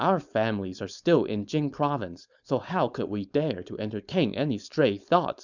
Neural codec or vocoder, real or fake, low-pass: codec, 16 kHz, 4.8 kbps, FACodec; fake; 7.2 kHz